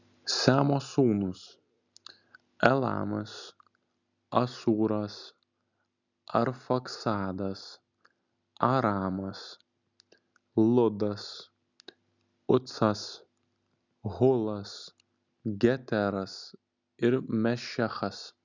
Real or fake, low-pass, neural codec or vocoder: real; 7.2 kHz; none